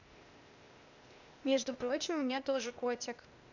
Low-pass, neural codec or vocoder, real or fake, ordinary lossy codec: 7.2 kHz; codec, 16 kHz, 0.8 kbps, ZipCodec; fake; none